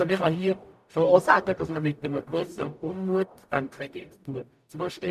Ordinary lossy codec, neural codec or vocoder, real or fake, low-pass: MP3, 96 kbps; codec, 44.1 kHz, 0.9 kbps, DAC; fake; 14.4 kHz